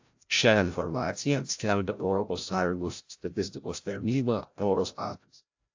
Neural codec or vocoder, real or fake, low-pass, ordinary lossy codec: codec, 16 kHz, 0.5 kbps, FreqCodec, larger model; fake; 7.2 kHz; AAC, 48 kbps